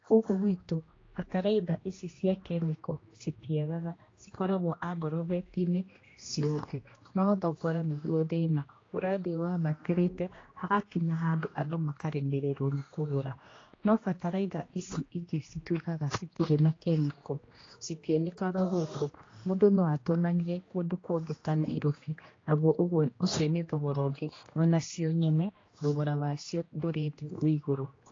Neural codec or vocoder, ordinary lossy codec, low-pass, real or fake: codec, 16 kHz, 1 kbps, X-Codec, HuBERT features, trained on general audio; AAC, 32 kbps; 7.2 kHz; fake